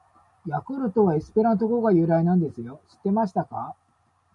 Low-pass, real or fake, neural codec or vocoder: 10.8 kHz; real; none